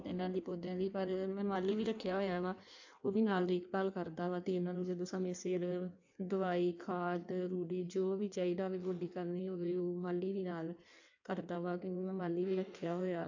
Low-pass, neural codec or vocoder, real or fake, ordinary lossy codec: 7.2 kHz; codec, 16 kHz in and 24 kHz out, 1.1 kbps, FireRedTTS-2 codec; fake; AAC, 48 kbps